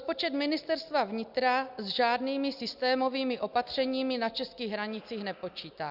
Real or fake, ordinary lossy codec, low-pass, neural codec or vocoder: real; Opus, 64 kbps; 5.4 kHz; none